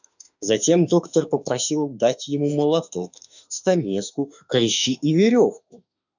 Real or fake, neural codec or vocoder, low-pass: fake; autoencoder, 48 kHz, 32 numbers a frame, DAC-VAE, trained on Japanese speech; 7.2 kHz